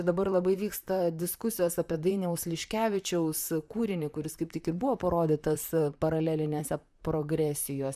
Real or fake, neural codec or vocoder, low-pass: fake; vocoder, 44.1 kHz, 128 mel bands, Pupu-Vocoder; 14.4 kHz